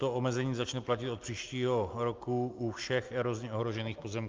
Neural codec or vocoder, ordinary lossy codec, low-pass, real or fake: none; Opus, 24 kbps; 7.2 kHz; real